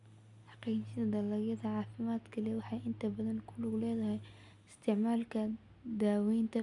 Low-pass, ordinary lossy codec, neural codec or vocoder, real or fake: 10.8 kHz; none; none; real